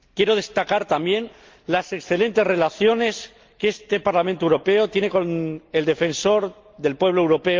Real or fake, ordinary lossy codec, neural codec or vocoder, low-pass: real; Opus, 32 kbps; none; 7.2 kHz